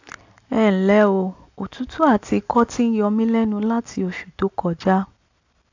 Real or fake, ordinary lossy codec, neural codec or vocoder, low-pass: real; AAC, 48 kbps; none; 7.2 kHz